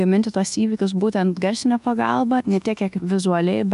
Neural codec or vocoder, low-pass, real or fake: codec, 24 kHz, 1.2 kbps, DualCodec; 10.8 kHz; fake